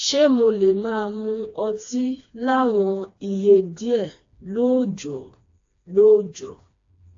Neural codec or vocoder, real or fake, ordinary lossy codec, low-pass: codec, 16 kHz, 2 kbps, FreqCodec, smaller model; fake; AAC, 48 kbps; 7.2 kHz